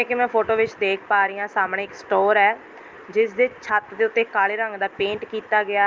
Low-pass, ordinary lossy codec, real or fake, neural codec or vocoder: 7.2 kHz; Opus, 24 kbps; real; none